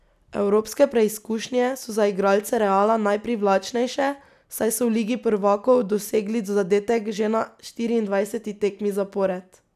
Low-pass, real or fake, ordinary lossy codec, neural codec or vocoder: 14.4 kHz; real; none; none